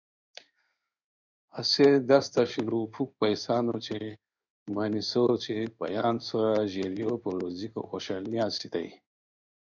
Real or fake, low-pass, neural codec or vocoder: fake; 7.2 kHz; codec, 16 kHz in and 24 kHz out, 1 kbps, XY-Tokenizer